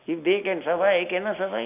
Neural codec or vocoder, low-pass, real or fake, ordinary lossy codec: none; 3.6 kHz; real; none